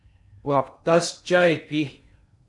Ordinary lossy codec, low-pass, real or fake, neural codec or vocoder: MP3, 64 kbps; 10.8 kHz; fake; codec, 16 kHz in and 24 kHz out, 0.6 kbps, FocalCodec, streaming, 2048 codes